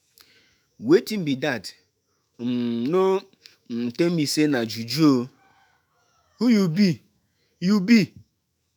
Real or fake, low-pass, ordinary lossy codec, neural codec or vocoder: fake; none; none; autoencoder, 48 kHz, 128 numbers a frame, DAC-VAE, trained on Japanese speech